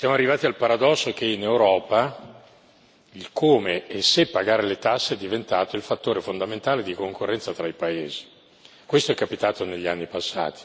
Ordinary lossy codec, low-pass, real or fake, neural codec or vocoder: none; none; real; none